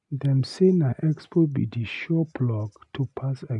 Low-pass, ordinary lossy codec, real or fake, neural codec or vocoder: 10.8 kHz; none; real; none